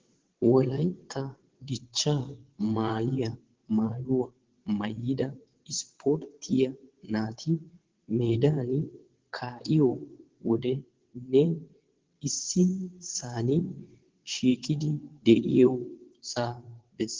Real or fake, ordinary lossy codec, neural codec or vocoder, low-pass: fake; Opus, 16 kbps; vocoder, 22.05 kHz, 80 mel bands, WaveNeXt; 7.2 kHz